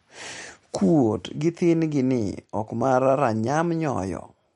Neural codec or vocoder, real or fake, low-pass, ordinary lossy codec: none; real; 19.8 kHz; MP3, 48 kbps